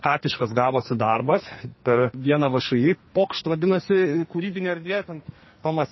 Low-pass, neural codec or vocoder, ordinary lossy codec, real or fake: 7.2 kHz; codec, 44.1 kHz, 2.6 kbps, SNAC; MP3, 24 kbps; fake